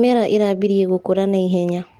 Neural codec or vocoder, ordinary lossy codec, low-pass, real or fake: none; Opus, 24 kbps; 19.8 kHz; real